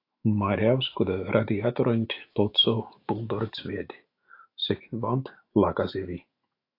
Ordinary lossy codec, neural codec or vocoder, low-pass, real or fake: AAC, 32 kbps; autoencoder, 48 kHz, 128 numbers a frame, DAC-VAE, trained on Japanese speech; 5.4 kHz; fake